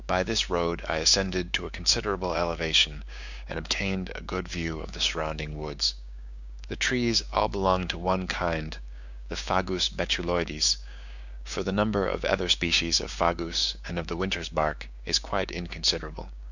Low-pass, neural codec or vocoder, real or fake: 7.2 kHz; codec, 16 kHz, 6 kbps, DAC; fake